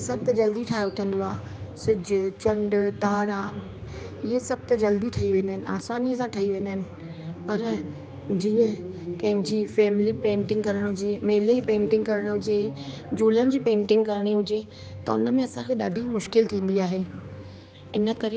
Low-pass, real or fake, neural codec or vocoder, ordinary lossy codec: none; fake; codec, 16 kHz, 2 kbps, X-Codec, HuBERT features, trained on general audio; none